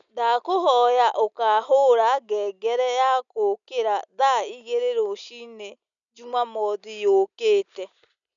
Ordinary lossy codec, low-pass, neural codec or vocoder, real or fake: none; 7.2 kHz; none; real